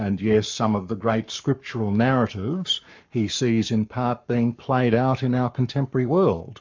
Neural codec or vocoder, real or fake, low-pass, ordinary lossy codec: codec, 44.1 kHz, 7.8 kbps, Pupu-Codec; fake; 7.2 kHz; MP3, 64 kbps